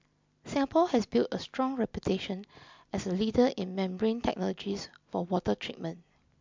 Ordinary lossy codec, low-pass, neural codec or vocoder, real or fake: MP3, 64 kbps; 7.2 kHz; none; real